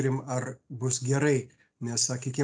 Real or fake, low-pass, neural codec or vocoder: real; 9.9 kHz; none